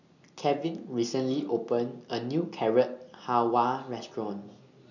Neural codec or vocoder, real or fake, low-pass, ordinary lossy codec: none; real; 7.2 kHz; none